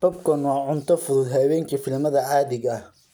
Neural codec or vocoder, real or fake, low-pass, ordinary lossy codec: none; real; none; none